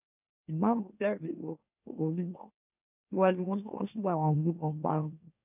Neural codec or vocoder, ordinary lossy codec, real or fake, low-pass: autoencoder, 44.1 kHz, a latent of 192 numbers a frame, MeloTTS; none; fake; 3.6 kHz